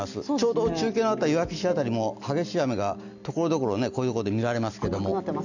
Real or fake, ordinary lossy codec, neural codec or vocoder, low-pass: real; none; none; 7.2 kHz